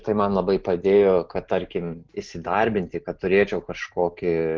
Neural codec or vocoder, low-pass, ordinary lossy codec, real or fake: none; 7.2 kHz; Opus, 16 kbps; real